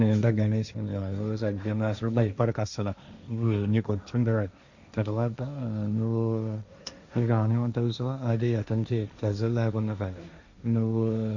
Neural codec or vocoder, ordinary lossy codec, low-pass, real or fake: codec, 16 kHz, 1.1 kbps, Voila-Tokenizer; Opus, 64 kbps; 7.2 kHz; fake